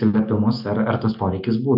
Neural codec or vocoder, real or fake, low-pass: none; real; 5.4 kHz